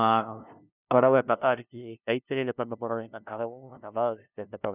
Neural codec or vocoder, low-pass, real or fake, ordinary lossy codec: codec, 16 kHz, 0.5 kbps, FunCodec, trained on LibriTTS, 25 frames a second; 3.6 kHz; fake; none